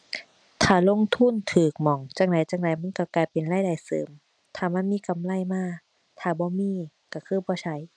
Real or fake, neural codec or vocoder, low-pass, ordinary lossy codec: real; none; 9.9 kHz; none